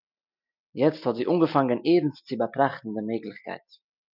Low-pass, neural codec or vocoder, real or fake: 5.4 kHz; none; real